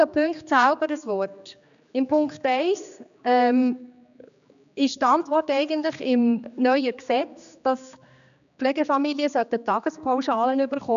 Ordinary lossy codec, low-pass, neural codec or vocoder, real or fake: none; 7.2 kHz; codec, 16 kHz, 2 kbps, X-Codec, HuBERT features, trained on general audio; fake